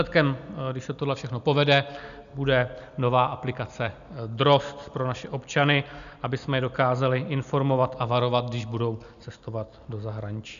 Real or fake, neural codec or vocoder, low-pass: real; none; 7.2 kHz